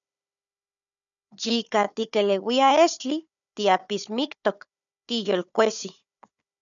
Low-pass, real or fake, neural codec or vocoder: 7.2 kHz; fake; codec, 16 kHz, 4 kbps, FunCodec, trained on Chinese and English, 50 frames a second